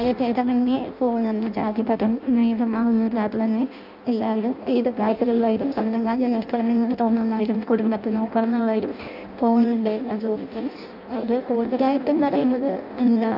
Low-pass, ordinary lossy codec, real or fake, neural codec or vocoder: 5.4 kHz; AAC, 48 kbps; fake; codec, 16 kHz in and 24 kHz out, 0.6 kbps, FireRedTTS-2 codec